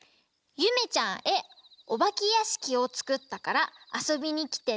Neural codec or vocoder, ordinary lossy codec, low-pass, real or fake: none; none; none; real